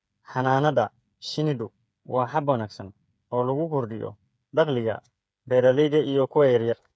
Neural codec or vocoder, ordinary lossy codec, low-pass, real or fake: codec, 16 kHz, 8 kbps, FreqCodec, smaller model; none; none; fake